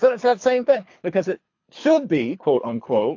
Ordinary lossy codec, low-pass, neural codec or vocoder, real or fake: AAC, 48 kbps; 7.2 kHz; codec, 24 kHz, 3 kbps, HILCodec; fake